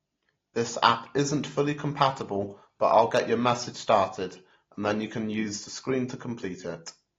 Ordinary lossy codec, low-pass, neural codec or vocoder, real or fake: AAC, 32 kbps; 7.2 kHz; none; real